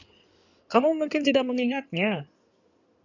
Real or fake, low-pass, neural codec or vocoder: fake; 7.2 kHz; codec, 16 kHz in and 24 kHz out, 2.2 kbps, FireRedTTS-2 codec